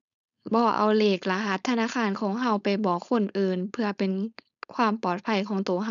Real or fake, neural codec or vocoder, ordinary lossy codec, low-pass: fake; codec, 16 kHz, 4.8 kbps, FACodec; none; 7.2 kHz